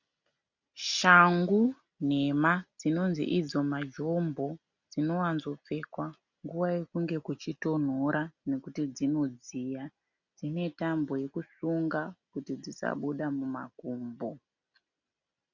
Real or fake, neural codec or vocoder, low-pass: real; none; 7.2 kHz